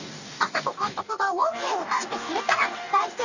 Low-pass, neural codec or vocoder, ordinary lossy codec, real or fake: 7.2 kHz; codec, 44.1 kHz, 2.6 kbps, DAC; none; fake